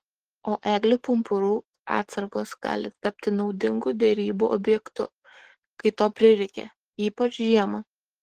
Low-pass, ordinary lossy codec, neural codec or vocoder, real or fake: 14.4 kHz; Opus, 16 kbps; codec, 44.1 kHz, 7.8 kbps, DAC; fake